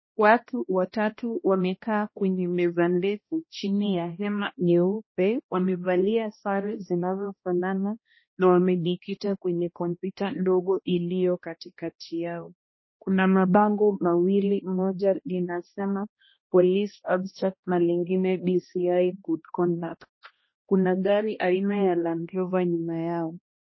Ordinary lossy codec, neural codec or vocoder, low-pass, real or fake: MP3, 24 kbps; codec, 16 kHz, 1 kbps, X-Codec, HuBERT features, trained on balanced general audio; 7.2 kHz; fake